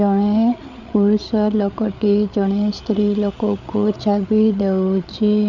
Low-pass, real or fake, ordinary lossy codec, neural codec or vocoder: 7.2 kHz; fake; none; codec, 16 kHz, 8 kbps, FreqCodec, larger model